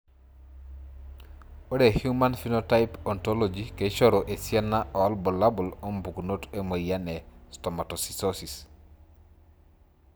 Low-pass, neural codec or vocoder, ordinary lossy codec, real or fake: none; none; none; real